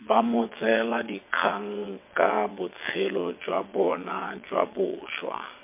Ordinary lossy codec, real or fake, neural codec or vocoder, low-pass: MP3, 24 kbps; fake; vocoder, 22.05 kHz, 80 mel bands, WaveNeXt; 3.6 kHz